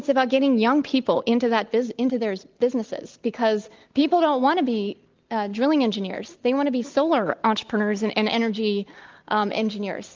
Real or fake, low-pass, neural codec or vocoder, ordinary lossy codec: real; 7.2 kHz; none; Opus, 24 kbps